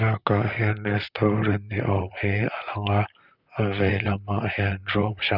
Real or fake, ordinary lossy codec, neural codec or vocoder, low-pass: fake; none; vocoder, 44.1 kHz, 128 mel bands, Pupu-Vocoder; 5.4 kHz